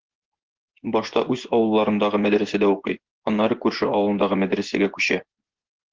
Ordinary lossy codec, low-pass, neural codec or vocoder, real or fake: Opus, 16 kbps; 7.2 kHz; vocoder, 24 kHz, 100 mel bands, Vocos; fake